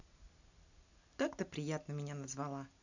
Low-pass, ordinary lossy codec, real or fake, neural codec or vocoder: 7.2 kHz; none; real; none